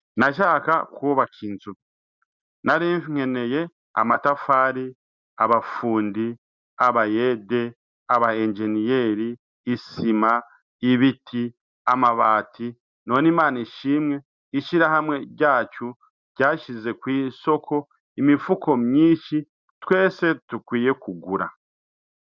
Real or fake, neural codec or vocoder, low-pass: real; none; 7.2 kHz